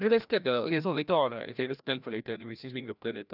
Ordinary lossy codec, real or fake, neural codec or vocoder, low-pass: none; fake; codec, 16 kHz, 1 kbps, FreqCodec, larger model; 5.4 kHz